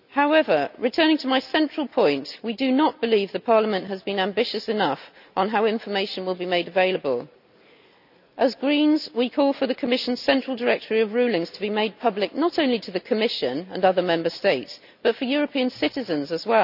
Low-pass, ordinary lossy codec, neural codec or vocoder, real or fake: 5.4 kHz; none; none; real